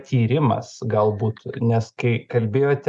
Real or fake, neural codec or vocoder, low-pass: real; none; 10.8 kHz